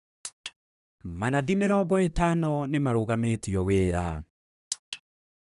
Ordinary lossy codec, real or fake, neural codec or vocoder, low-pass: none; fake; codec, 24 kHz, 1 kbps, SNAC; 10.8 kHz